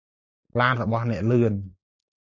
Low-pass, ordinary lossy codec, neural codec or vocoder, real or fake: 7.2 kHz; AAC, 48 kbps; none; real